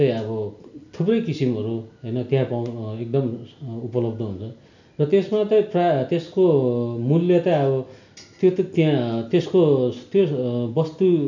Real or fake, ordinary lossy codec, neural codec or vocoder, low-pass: real; none; none; 7.2 kHz